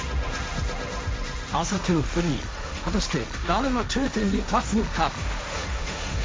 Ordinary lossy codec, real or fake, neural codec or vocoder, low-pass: none; fake; codec, 16 kHz, 1.1 kbps, Voila-Tokenizer; none